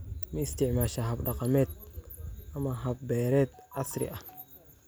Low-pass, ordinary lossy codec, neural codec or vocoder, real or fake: none; none; none; real